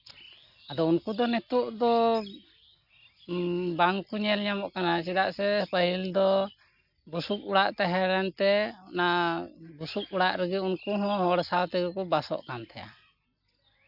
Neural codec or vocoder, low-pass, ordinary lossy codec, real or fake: none; 5.4 kHz; none; real